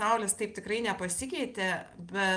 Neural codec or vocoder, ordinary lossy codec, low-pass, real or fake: none; Opus, 32 kbps; 9.9 kHz; real